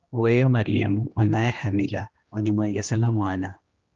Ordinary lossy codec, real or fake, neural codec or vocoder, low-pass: Opus, 32 kbps; fake; codec, 16 kHz, 1 kbps, X-Codec, HuBERT features, trained on general audio; 7.2 kHz